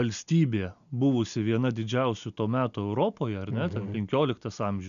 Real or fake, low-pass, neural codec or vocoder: real; 7.2 kHz; none